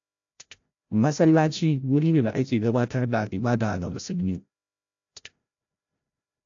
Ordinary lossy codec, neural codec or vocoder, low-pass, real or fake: AAC, 48 kbps; codec, 16 kHz, 0.5 kbps, FreqCodec, larger model; 7.2 kHz; fake